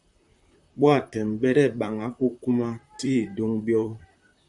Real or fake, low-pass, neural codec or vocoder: fake; 10.8 kHz; vocoder, 44.1 kHz, 128 mel bands, Pupu-Vocoder